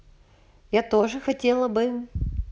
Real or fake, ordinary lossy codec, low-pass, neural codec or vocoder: real; none; none; none